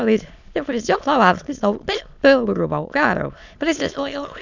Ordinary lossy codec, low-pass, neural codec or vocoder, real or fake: none; 7.2 kHz; autoencoder, 22.05 kHz, a latent of 192 numbers a frame, VITS, trained on many speakers; fake